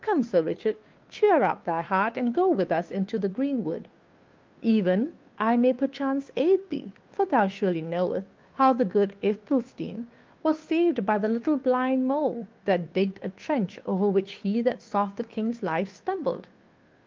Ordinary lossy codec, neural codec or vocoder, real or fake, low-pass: Opus, 32 kbps; codec, 16 kHz, 2 kbps, FunCodec, trained on Chinese and English, 25 frames a second; fake; 7.2 kHz